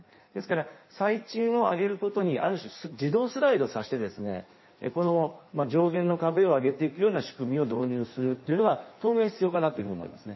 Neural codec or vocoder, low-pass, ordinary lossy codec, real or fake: codec, 16 kHz in and 24 kHz out, 1.1 kbps, FireRedTTS-2 codec; 7.2 kHz; MP3, 24 kbps; fake